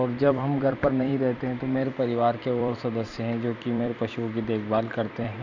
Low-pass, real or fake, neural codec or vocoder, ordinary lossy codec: 7.2 kHz; fake; vocoder, 44.1 kHz, 128 mel bands every 256 samples, BigVGAN v2; none